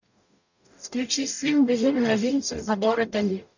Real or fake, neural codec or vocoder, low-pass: fake; codec, 44.1 kHz, 0.9 kbps, DAC; 7.2 kHz